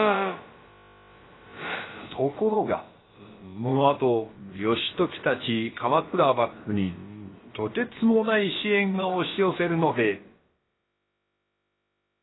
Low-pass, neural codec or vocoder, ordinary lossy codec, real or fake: 7.2 kHz; codec, 16 kHz, about 1 kbps, DyCAST, with the encoder's durations; AAC, 16 kbps; fake